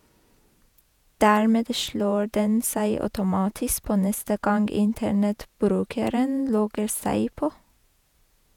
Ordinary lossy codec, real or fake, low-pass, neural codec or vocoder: none; fake; 19.8 kHz; vocoder, 48 kHz, 128 mel bands, Vocos